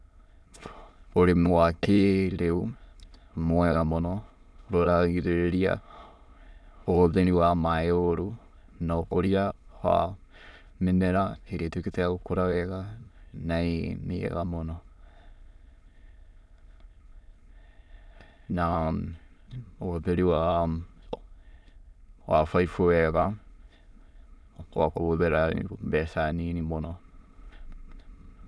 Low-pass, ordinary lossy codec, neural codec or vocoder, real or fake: none; none; autoencoder, 22.05 kHz, a latent of 192 numbers a frame, VITS, trained on many speakers; fake